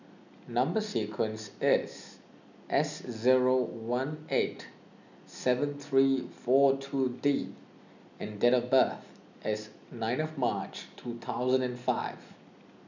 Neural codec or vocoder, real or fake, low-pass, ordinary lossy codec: none; real; 7.2 kHz; none